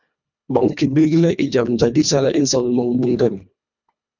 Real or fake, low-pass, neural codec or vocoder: fake; 7.2 kHz; codec, 24 kHz, 1.5 kbps, HILCodec